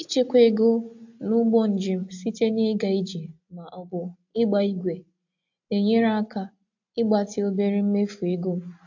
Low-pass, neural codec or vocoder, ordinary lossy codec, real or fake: 7.2 kHz; none; AAC, 48 kbps; real